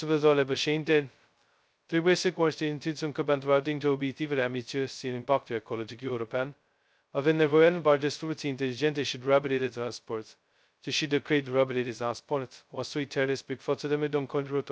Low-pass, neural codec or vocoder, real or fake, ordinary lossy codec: none; codec, 16 kHz, 0.2 kbps, FocalCodec; fake; none